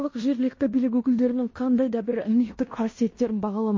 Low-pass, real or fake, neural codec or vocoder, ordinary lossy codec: 7.2 kHz; fake; codec, 16 kHz in and 24 kHz out, 0.9 kbps, LongCat-Audio-Codec, fine tuned four codebook decoder; MP3, 32 kbps